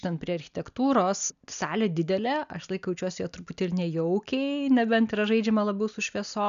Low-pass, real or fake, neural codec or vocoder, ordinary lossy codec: 7.2 kHz; real; none; MP3, 96 kbps